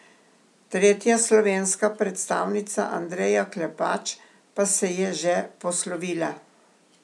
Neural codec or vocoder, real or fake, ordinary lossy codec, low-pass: none; real; none; none